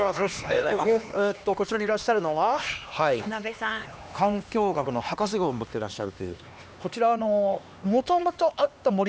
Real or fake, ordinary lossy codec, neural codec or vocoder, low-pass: fake; none; codec, 16 kHz, 2 kbps, X-Codec, HuBERT features, trained on LibriSpeech; none